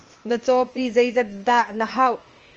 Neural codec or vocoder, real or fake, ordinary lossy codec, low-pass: codec, 16 kHz, 0.8 kbps, ZipCodec; fake; Opus, 24 kbps; 7.2 kHz